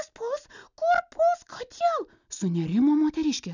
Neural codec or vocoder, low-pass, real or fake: none; 7.2 kHz; real